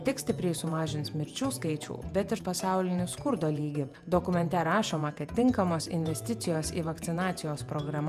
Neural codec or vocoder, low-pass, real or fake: vocoder, 48 kHz, 128 mel bands, Vocos; 14.4 kHz; fake